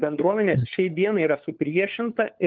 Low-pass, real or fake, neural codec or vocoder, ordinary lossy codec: 7.2 kHz; fake; codec, 16 kHz, 4 kbps, FunCodec, trained on LibriTTS, 50 frames a second; Opus, 32 kbps